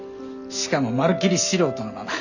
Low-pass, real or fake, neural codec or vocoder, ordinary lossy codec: 7.2 kHz; real; none; none